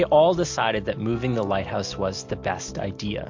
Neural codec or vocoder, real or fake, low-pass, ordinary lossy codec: none; real; 7.2 kHz; MP3, 48 kbps